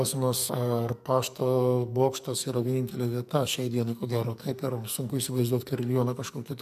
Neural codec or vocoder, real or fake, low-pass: codec, 44.1 kHz, 2.6 kbps, SNAC; fake; 14.4 kHz